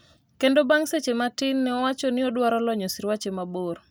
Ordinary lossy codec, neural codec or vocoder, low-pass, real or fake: none; none; none; real